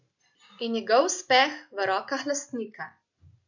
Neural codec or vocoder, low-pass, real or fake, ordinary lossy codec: none; 7.2 kHz; real; none